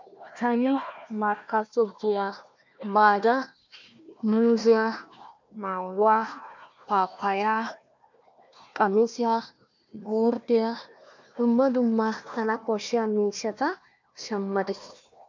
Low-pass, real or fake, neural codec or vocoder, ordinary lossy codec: 7.2 kHz; fake; codec, 16 kHz, 1 kbps, FunCodec, trained on Chinese and English, 50 frames a second; MP3, 64 kbps